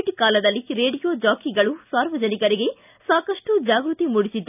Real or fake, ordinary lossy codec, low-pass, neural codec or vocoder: real; none; 3.6 kHz; none